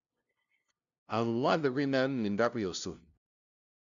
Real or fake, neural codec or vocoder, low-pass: fake; codec, 16 kHz, 0.5 kbps, FunCodec, trained on LibriTTS, 25 frames a second; 7.2 kHz